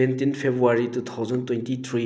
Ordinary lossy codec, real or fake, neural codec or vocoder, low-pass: none; real; none; none